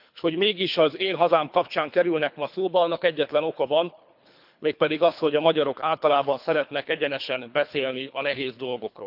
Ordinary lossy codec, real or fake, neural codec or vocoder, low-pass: none; fake; codec, 24 kHz, 3 kbps, HILCodec; 5.4 kHz